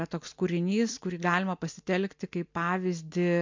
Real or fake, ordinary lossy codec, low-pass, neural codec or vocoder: real; MP3, 64 kbps; 7.2 kHz; none